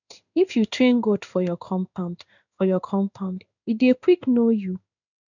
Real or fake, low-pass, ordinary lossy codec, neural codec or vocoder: fake; 7.2 kHz; none; codec, 16 kHz in and 24 kHz out, 1 kbps, XY-Tokenizer